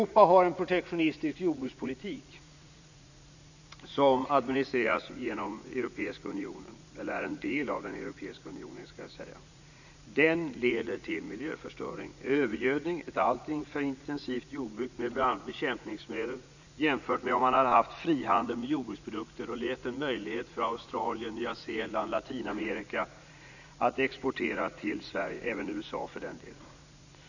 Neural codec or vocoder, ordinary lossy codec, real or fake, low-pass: vocoder, 44.1 kHz, 80 mel bands, Vocos; none; fake; 7.2 kHz